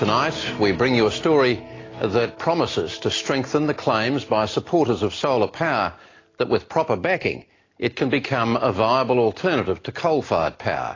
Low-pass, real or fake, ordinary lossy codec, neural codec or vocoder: 7.2 kHz; real; AAC, 32 kbps; none